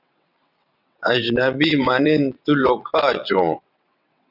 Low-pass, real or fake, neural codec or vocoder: 5.4 kHz; fake; vocoder, 22.05 kHz, 80 mel bands, WaveNeXt